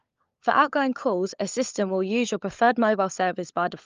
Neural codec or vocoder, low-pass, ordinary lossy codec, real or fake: codec, 16 kHz, 16 kbps, FunCodec, trained on LibriTTS, 50 frames a second; 7.2 kHz; Opus, 32 kbps; fake